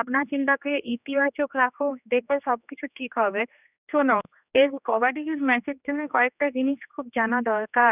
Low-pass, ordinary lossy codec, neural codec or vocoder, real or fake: 3.6 kHz; none; codec, 16 kHz, 2 kbps, X-Codec, HuBERT features, trained on general audio; fake